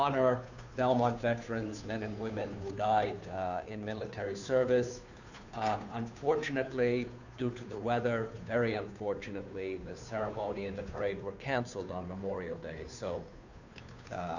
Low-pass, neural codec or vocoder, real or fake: 7.2 kHz; codec, 16 kHz, 2 kbps, FunCodec, trained on Chinese and English, 25 frames a second; fake